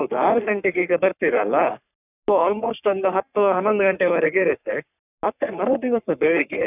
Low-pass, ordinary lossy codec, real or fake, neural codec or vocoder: 3.6 kHz; none; fake; codec, 44.1 kHz, 3.4 kbps, Pupu-Codec